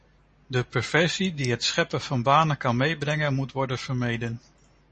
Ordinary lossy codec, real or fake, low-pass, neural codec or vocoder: MP3, 32 kbps; real; 10.8 kHz; none